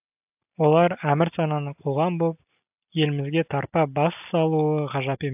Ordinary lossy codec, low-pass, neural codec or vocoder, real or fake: AAC, 32 kbps; 3.6 kHz; none; real